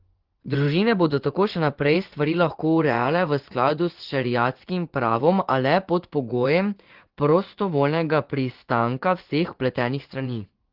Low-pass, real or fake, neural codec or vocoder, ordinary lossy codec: 5.4 kHz; fake; vocoder, 24 kHz, 100 mel bands, Vocos; Opus, 16 kbps